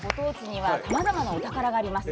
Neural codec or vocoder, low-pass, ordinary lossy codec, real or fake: none; none; none; real